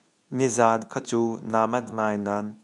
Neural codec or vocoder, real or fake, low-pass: codec, 24 kHz, 0.9 kbps, WavTokenizer, medium speech release version 2; fake; 10.8 kHz